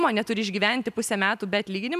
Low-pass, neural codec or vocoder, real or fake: 14.4 kHz; none; real